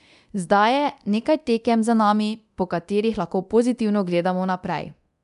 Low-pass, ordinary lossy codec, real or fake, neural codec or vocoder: 10.8 kHz; none; fake; codec, 24 kHz, 0.9 kbps, DualCodec